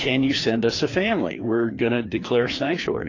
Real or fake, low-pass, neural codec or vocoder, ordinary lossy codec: fake; 7.2 kHz; codec, 16 kHz, 2 kbps, FreqCodec, larger model; AAC, 32 kbps